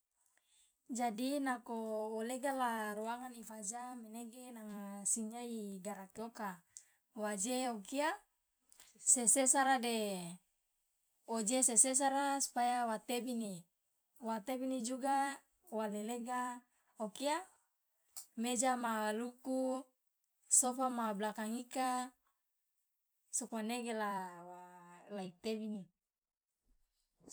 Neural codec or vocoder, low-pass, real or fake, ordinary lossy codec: vocoder, 44.1 kHz, 128 mel bands every 256 samples, BigVGAN v2; none; fake; none